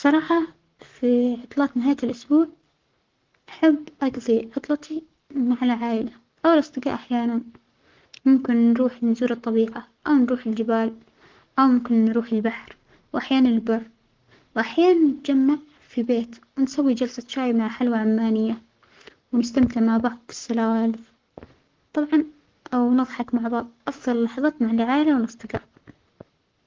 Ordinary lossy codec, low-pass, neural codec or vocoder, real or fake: Opus, 16 kbps; 7.2 kHz; codec, 44.1 kHz, 7.8 kbps, Pupu-Codec; fake